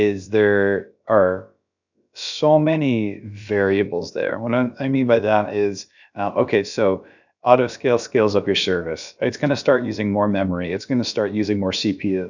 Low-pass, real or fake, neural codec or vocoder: 7.2 kHz; fake; codec, 16 kHz, about 1 kbps, DyCAST, with the encoder's durations